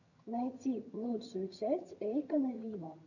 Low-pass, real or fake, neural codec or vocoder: 7.2 kHz; fake; vocoder, 22.05 kHz, 80 mel bands, HiFi-GAN